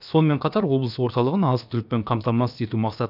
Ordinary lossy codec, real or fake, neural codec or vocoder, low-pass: none; fake; codec, 16 kHz, about 1 kbps, DyCAST, with the encoder's durations; 5.4 kHz